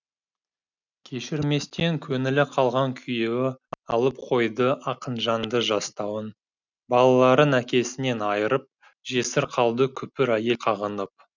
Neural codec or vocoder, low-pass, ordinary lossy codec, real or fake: none; 7.2 kHz; none; real